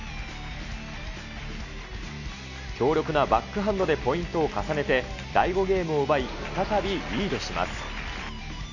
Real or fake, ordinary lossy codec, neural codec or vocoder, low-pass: real; none; none; 7.2 kHz